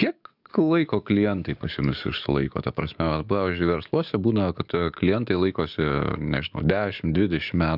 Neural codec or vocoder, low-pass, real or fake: codec, 44.1 kHz, 7.8 kbps, DAC; 5.4 kHz; fake